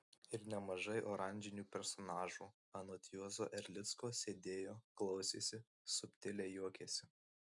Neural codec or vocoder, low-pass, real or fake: none; 10.8 kHz; real